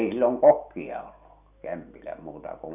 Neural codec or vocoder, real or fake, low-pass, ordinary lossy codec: none; real; 3.6 kHz; none